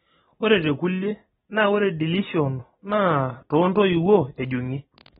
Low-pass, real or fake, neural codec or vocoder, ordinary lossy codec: 19.8 kHz; real; none; AAC, 16 kbps